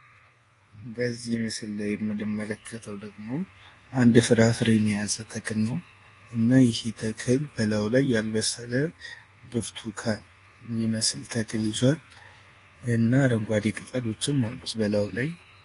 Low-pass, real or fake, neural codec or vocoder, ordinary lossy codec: 10.8 kHz; fake; codec, 24 kHz, 1.2 kbps, DualCodec; AAC, 32 kbps